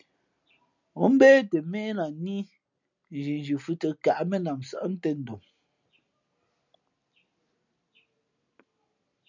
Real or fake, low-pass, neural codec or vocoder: real; 7.2 kHz; none